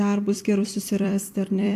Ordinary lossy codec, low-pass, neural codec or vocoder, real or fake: AAC, 64 kbps; 14.4 kHz; vocoder, 44.1 kHz, 128 mel bands every 256 samples, BigVGAN v2; fake